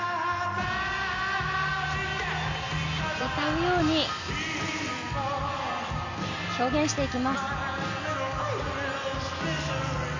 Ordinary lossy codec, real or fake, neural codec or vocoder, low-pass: MP3, 64 kbps; real; none; 7.2 kHz